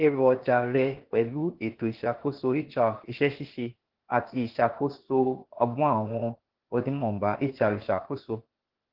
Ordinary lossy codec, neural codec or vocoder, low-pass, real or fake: Opus, 16 kbps; codec, 16 kHz, 0.8 kbps, ZipCodec; 5.4 kHz; fake